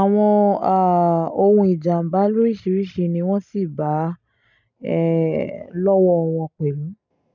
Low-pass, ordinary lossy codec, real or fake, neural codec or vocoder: 7.2 kHz; none; real; none